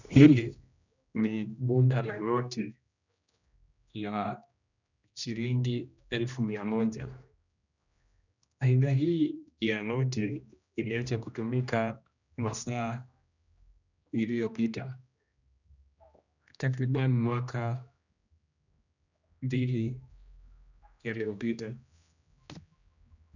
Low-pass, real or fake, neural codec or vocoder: 7.2 kHz; fake; codec, 16 kHz, 1 kbps, X-Codec, HuBERT features, trained on general audio